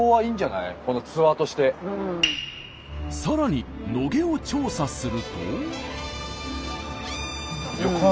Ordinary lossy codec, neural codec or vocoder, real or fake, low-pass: none; none; real; none